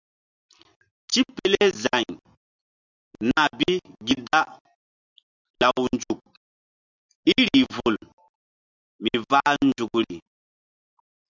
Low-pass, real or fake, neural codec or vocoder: 7.2 kHz; real; none